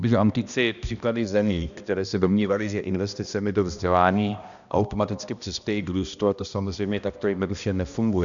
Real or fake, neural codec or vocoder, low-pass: fake; codec, 16 kHz, 1 kbps, X-Codec, HuBERT features, trained on balanced general audio; 7.2 kHz